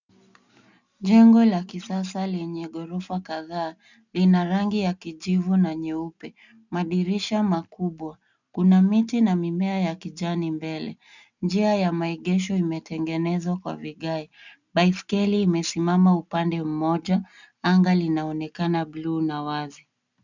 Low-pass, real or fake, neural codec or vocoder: 7.2 kHz; real; none